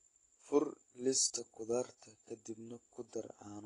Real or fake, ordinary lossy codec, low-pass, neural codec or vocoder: real; AAC, 32 kbps; 10.8 kHz; none